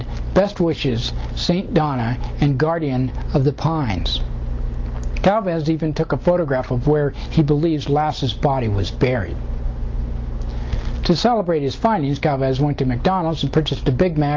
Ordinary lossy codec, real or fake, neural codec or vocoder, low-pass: Opus, 24 kbps; real; none; 7.2 kHz